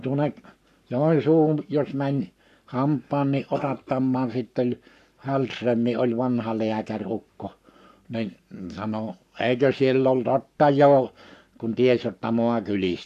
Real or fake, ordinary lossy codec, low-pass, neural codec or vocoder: fake; none; 14.4 kHz; codec, 44.1 kHz, 7.8 kbps, Pupu-Codec